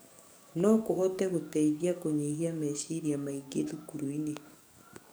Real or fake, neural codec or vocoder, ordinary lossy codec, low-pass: fake; codec, 44.1 kHz, 7.8 kbps, DAC; none; none